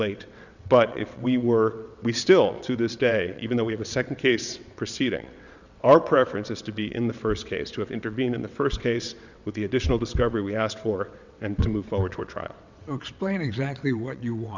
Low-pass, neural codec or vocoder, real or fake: 7.2 kHz; vocoder, 22.05 kHz, 80 mel bands, WaveNeXt; fake